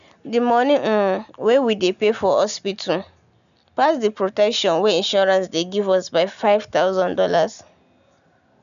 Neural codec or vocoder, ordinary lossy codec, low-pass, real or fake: none; none; 7.2 kHz; real